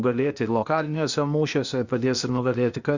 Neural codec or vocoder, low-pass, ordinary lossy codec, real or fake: codec, 16 kHz, 0.8 kbps, ZipCodec; 7.2 kHz; Opus, 64 kbps; fake